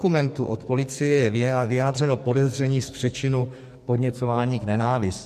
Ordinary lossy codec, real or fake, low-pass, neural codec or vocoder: MP3, 64 kbps; fake; 14.4 kHz; codec, 44.1 kHz, 2.6 kbps, SNAC